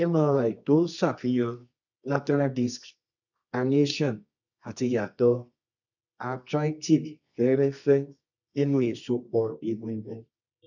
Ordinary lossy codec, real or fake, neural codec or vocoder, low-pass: none; fake; codec, 24 kHz, 0.9 kbps, WavTokenizer, medium music audio release; 7.2 kHz